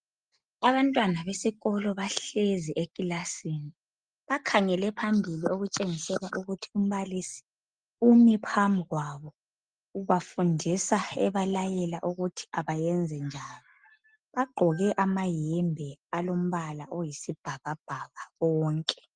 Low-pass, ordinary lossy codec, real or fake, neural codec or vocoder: 9.9 kHz; Opus, 24 kbps; real; none